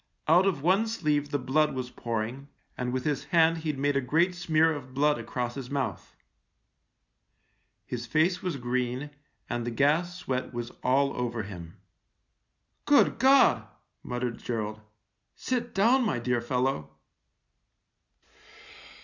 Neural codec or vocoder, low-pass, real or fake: none; 7.2 kHz; real